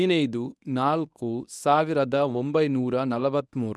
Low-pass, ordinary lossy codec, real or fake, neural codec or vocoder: none; none; fake; codec, 24 kHz, 0.9 kbps, WavTokenizer, medium speech release version 1